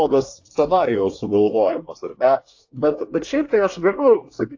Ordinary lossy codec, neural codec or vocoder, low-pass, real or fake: AAC, 48 kbps; codec, 24 kHz, 1 kbps, SNAC; 7.2 kHz; fake